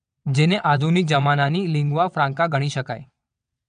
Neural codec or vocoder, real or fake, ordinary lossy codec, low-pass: vocoder, 22.05 kHz, 80 mel bands, WaveNeXt; fake; none; 9.9 kHz